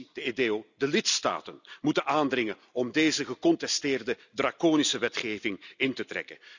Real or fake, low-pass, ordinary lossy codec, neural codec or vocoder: real; 7.2 kHz; none; none